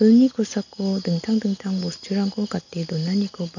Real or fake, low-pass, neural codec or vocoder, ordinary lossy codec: real; 7.2 kHz; none; none